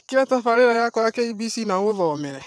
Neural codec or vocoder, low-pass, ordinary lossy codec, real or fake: vocoder, 22.05 kHz, 80 mel bands, WaveNeXt; none; none; fake